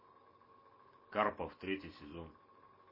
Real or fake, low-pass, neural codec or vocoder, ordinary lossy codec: real; 5.4 kHz; none; MP3, 24 kbps